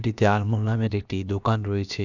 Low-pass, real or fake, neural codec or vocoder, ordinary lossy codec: 7.2 kHz; fake; codec, 16 kHz, about 1 kbps, DyCAST, with the encoder's durations; none